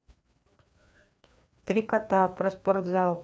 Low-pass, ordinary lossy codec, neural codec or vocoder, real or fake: none; none; codec, 16 kHz, 2 kbps, FreqCodec, larger model; fake